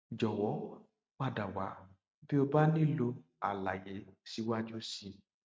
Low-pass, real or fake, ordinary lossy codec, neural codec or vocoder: none; real; none; none